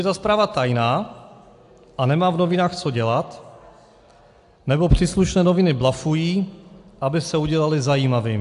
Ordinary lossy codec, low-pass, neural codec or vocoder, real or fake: AAC, 64 kbps; 10.8 kHz; none; real